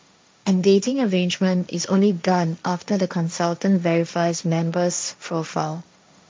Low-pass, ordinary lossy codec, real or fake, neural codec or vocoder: none; none; fake; codec, 16 kHz, 1.1 kbps, Voila-Tokenizer